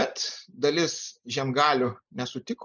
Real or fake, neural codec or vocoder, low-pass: real; none; 7.2 kHz